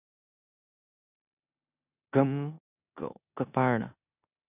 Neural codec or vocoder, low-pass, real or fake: codec, 16 kHz in and 24 kHz out, 0.9 kbps, LongCat-Audio-Codec, four codebook decoder; 3.6 kHz; fake